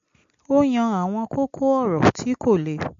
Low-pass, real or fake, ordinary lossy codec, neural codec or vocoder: 7.2 kHz; real; MP3, 48 kbps; none